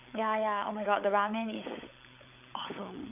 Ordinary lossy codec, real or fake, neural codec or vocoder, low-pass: none; fake; codec, 16 kHz, 16 kbps, FunCodec, trained on LibriTTS, 50 frames a second; 3.6 kHz